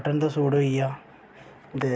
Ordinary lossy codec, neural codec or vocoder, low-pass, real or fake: none; none; none; real